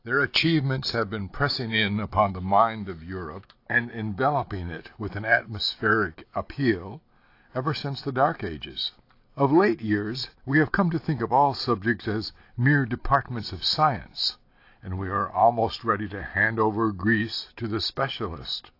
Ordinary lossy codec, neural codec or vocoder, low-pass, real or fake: AAC, 32 kbps; none; 5.4 kHz; real